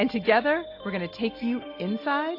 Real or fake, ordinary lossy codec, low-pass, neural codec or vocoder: real; AAC, 32 kbps; 5.4 kHz; none